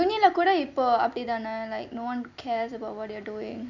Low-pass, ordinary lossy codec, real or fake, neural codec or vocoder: 7.2 kHz; none; real; none